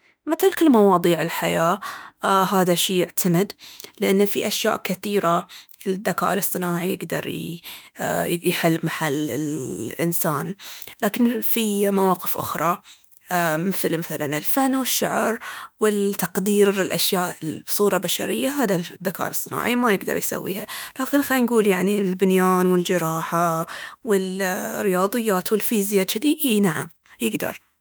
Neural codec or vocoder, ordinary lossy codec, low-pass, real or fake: autoencoder, 48 kHz, 32 numbers a frame, DAC-VAE, trained on Japanese speech; none; none; fake